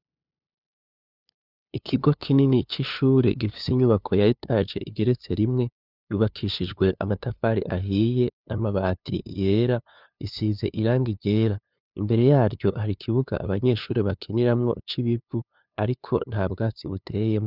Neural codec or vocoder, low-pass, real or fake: codec, 16 kHz, 2 kbps, FunCodec, trained on LibriTTS, 25 frames a second; 5.4 kHz; fake